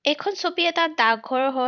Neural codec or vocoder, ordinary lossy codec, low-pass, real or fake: vocoder, 44.1 kHz, 128 mel bands every 256 samples, BigVGAN v2; none; 7.2 kHz; fake